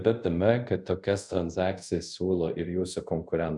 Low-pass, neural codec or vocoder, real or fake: 10.8 kHz; codec, 24 kHz, 0.5 kbps, DualCodec; fake